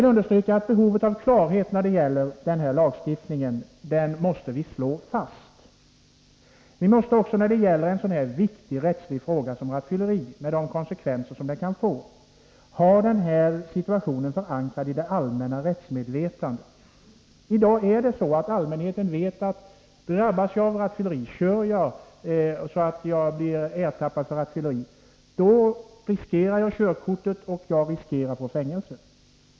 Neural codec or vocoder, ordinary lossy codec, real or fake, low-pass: none; none; real; none